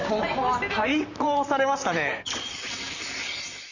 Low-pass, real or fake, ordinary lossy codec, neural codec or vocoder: 7.2 kHz; fake; none; vocoder, 44.1 kHz, 128 mel bands, Pupu-Vocoder